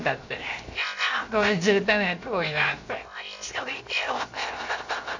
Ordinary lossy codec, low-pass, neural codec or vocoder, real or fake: MP3, 48 kbps; 7.2 kHz; codec, 16 kHz, 0.7 kbps, FocalCodec; fake